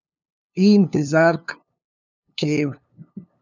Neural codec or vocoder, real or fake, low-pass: codec, 16 kHz, 2 kbps, FunCodec, trained on LibriTTS, 25 frames a second; fake; 7.2 kHz